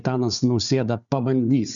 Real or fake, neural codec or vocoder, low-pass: fake; codec, 16 kHz, 4 kbps, FunCodec, trained on Chinese and English, 50 frames a second; 7.2 kHz